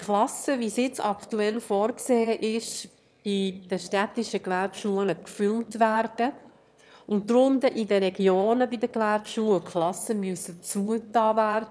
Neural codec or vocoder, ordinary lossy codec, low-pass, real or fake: autoencoder, 22.05 kHz, a latent of 192 numbers a frame, VITS, trained on one speaker; none; none; fake